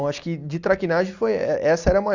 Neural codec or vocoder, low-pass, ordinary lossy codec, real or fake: none; 7.2 kHz; Opus, 64 kbps; real